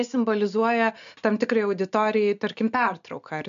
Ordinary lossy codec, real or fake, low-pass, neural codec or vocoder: MP3, 64 kbps; real; 7.2 kHz; none